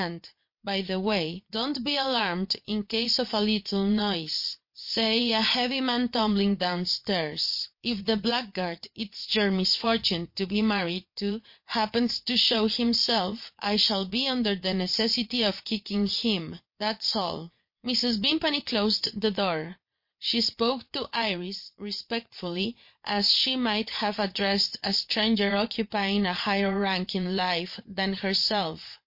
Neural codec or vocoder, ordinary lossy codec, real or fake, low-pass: vocoder, 22.05 kHz, 80 mel bands, WaveNeXt; MP3, 32 kbps; fake; 5.4 kHz